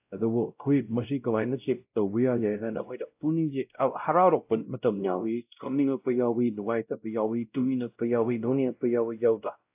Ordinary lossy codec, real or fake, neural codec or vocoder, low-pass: AAC, 32 kbps; fake; codec, 16 kHz, 0.5 kbps, X-Codec, WavLM features, trained on Multilingual LibriSpeech; 3.6 kHz